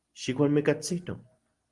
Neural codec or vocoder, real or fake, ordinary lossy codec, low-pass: none; real; Opus, 24 kbps; 10.8 kHz